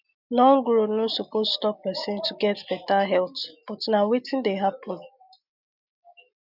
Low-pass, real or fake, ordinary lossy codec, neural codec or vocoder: 5.4 kHz; real; none; none